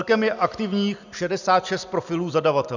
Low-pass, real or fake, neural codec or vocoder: 7.2 kHz; real; none